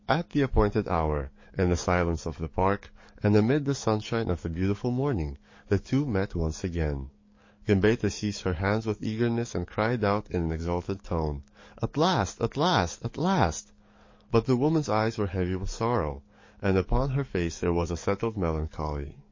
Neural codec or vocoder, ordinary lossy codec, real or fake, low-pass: codec, 44.1 kHz, 7.8 kbps, DAC; MP3, 32 kbps; fake; 7.2 kHz